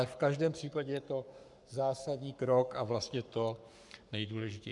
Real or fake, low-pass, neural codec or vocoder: fake; 10.8 kHz; codec, 44.1 kHz, 7.8 kbps, Pupu-Codec